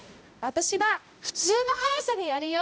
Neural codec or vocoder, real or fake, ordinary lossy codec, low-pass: codec, 16 kHz, 0.5 kbps, X-Codec, HuBERT features, trained on balanced general audio; fake; none; none